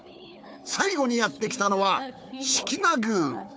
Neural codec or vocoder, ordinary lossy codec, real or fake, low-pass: codec, 16 kHz, 4 kbps, FunCodec, trained on Chinese and English, 50 frames a second; none; fake; none